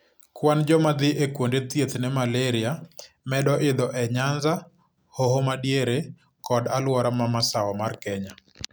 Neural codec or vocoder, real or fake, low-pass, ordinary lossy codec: none; real; none; none